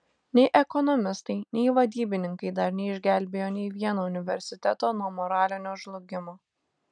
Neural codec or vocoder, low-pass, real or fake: none; 9.9 kHz; real